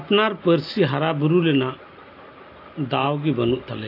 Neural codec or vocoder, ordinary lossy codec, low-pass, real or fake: none; AAC, 32 kbps; 5.4 kHz; real